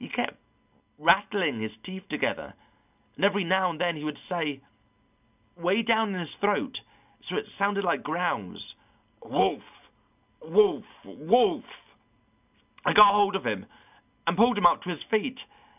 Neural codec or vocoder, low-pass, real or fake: none; 3.6 kHz; real